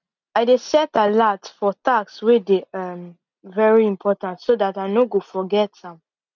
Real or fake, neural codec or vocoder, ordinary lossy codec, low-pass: real; none; none; 7.2 kHz